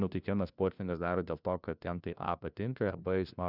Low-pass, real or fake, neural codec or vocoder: 5.4 kHz; fake; codec, 16 kHz, 1 kbps, FunCodec, trained on LibriTTS, 50 frames a second